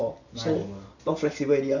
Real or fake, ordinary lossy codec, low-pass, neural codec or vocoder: real; none; 7.2 kHz; none